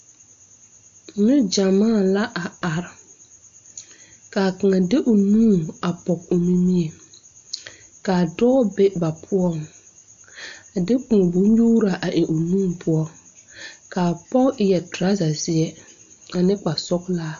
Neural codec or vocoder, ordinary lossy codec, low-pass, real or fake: none; MP3, 64 kbps; 7.2 kHz; real